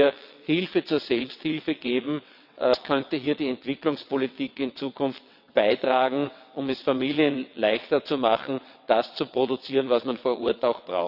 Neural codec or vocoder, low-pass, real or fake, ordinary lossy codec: vocoder, 22.05 kHz, 80 mel bands, WaveNeXt; 5.4 kHz; fake; none